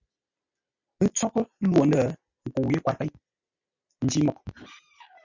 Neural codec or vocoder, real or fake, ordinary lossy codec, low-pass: none; real; Opus, 64 kbps; 7.2 kHz